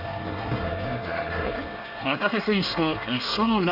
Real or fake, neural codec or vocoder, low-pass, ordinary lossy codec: fake; codec, 24 kHz, 1 kbps, SNAC; 5.4 kHz; none